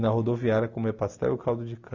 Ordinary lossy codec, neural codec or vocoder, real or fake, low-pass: none; none; real; 7.2 kHz